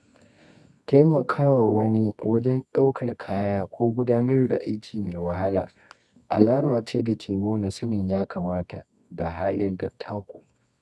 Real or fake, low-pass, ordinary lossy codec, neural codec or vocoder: fake; none; none; codec, 24 kHz, 0.9 kbps, WavTokenizer, medium music audio release